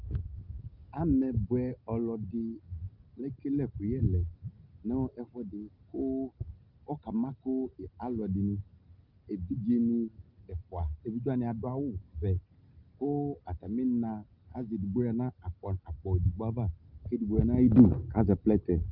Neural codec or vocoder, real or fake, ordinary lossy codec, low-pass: none; real; Opus, 32 kbps; 5.4 kHz